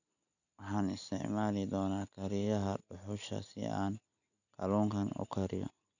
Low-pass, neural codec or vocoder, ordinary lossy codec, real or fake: 7.2 kHz; none; none; real